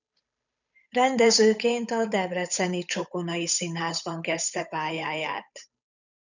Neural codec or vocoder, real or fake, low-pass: codec, 16 kHz, 8 kbps, FunCodec, trained on Chinese and English, 25 frames a second; fake; 7.2 kHz